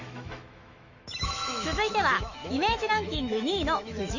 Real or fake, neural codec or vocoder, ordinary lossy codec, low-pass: fake; autoencoder, 48 kHz, 128 numbers a frame, DAC-VAE, trained on Japanese speech; none; 7.2 kHz